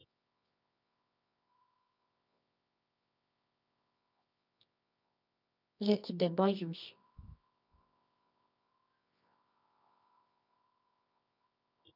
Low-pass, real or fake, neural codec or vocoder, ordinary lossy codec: 5.4 kHz; fake; codec, 24 kHz, 0.9 kbps, WavTokenizer, medium music audio release; none